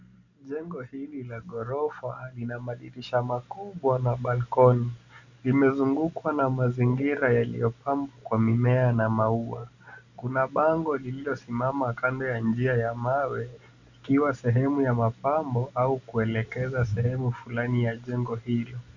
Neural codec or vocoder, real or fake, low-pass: none; real; 7.2 kHz